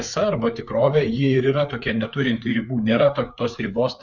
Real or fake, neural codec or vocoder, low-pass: fake; codec, 16 kHz, 4 kbps, FreqCodec, larger model; 7.2 kHz